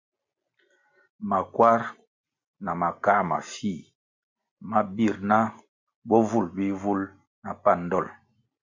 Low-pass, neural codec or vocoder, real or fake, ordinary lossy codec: 7.2 kHz; none; real; MP3, 48 kbps